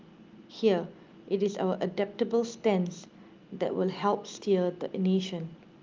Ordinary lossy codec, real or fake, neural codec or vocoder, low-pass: Opus, 32 kbps; real; none; 7.2 kHz